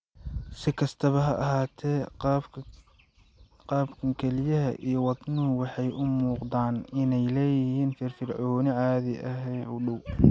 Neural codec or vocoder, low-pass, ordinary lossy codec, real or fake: none; none; none; real